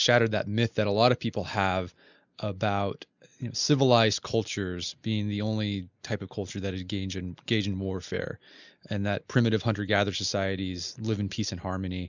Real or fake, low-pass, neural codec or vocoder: real; 7.2 kHz; none